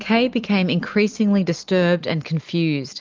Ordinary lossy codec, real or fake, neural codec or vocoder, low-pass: Opus, 24 kbps; real; none; 7.2 kHz